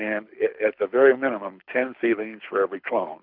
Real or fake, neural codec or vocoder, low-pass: fake; codec, 24 kHz, 6 kbps, HILCodec; 5.4 kHz